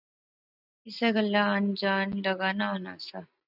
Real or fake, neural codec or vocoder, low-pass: real; none; 5.4 kHz